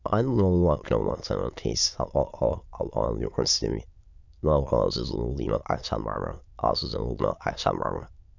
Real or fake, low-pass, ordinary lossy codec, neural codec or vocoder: fake; 7.2 kHz; none; autoencoder, 22.05 kHz, a latent of 192 numbers a frame, VITS, trained on many speakers